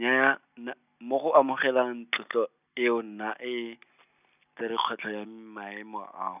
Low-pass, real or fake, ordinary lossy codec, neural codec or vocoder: 3.6 kHz; real; none; none